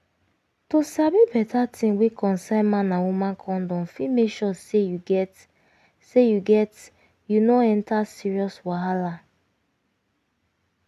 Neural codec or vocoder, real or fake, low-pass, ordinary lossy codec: none; real; 14.4 kHz; none